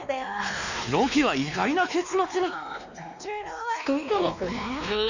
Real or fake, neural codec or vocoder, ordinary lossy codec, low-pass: fake; codec, 16 kHz, 2 kbps, X-Codec, WavLM features, trained on Multilingual LibriSpeech; none; 7.2 kHz